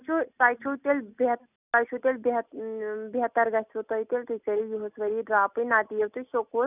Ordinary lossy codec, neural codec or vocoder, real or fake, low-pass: none; none; real; 3.6 kHz